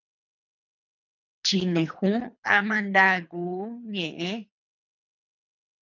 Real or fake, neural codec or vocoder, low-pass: fake; codec, 24 kHz, 3 kbps, HILCodec; 7.2 kHz